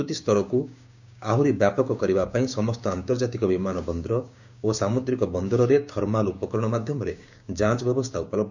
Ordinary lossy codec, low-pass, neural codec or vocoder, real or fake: none; 7.2 kHz; codec, 16 kHz, 6 kbps, DAC; fake